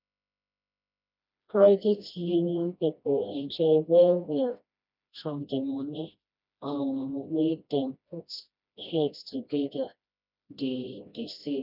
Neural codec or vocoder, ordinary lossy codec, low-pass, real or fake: codec, 16 kHz, 1 kbps, FreqCodec, smaller model; none; 5.4 kHz; fake